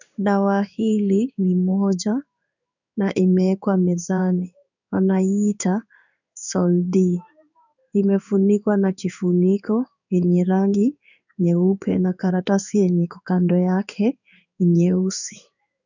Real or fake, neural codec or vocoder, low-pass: fake; codec, 16 kHz in and 24 kHz out, 1 kbps, XY-Tokenizer; 7.2 kHz